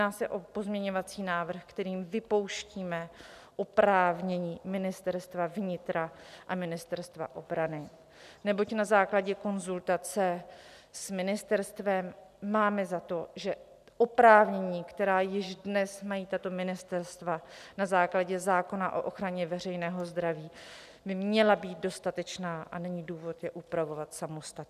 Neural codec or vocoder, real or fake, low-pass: none; real; 14.4 kHz